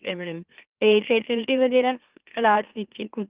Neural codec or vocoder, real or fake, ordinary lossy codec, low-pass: autoencoder, 44.1 kHz, a latent of 192 numbers a frame, MeloTTS; fake; Opus, 32 kbps; 3.6 kHz